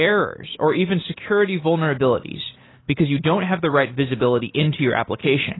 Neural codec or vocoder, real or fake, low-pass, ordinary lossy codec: none; real; 7.2 kHz; AAC, 16 kbps